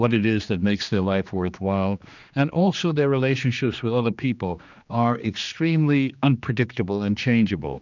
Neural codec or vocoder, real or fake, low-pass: codec, 16 kHz, 2 kbps, X-Codec, HuBERT features, trained on general audio; fake; 7.2 kHz